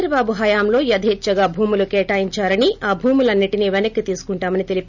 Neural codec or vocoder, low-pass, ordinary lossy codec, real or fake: none; 7.2 kHz; none; real